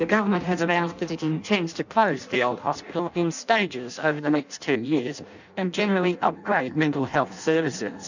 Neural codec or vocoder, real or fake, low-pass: codec, 16 kHz in and 24 kHz out, 0.6 kbps, FireRedTTS-2 codec; fake; 7.2 kHz